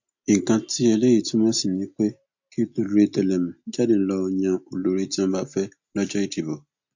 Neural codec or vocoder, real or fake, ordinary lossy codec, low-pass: none; real; MP3, 48 kbps; 7.2 kHz